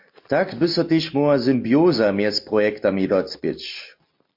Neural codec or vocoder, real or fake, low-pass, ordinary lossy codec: none; real; 5.4 kHz; MP3, 32 kbps